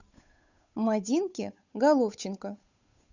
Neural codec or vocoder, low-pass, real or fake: codec, 16 kHz, 8 kbps, FunCodec, trained on Chinese and English, 25 frames a second; 7.2 kHz; fake